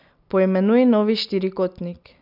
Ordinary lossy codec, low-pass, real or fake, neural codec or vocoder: none; 5.4 kHz; real; none